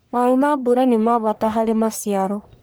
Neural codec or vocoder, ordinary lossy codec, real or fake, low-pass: codec, 44.1 kHz, 1.7 kbps, Pupu-Codec; none; fake; none